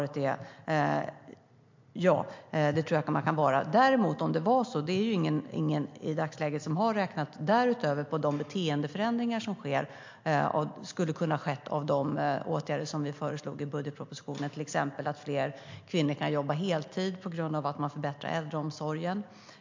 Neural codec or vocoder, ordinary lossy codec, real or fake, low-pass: none; MP3, 48 kbps; real; 7.2 kHz